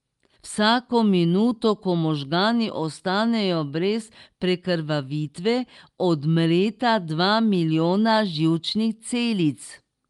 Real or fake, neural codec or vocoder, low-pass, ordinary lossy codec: real; none; 10.8 kHz; Opus, 32 kbps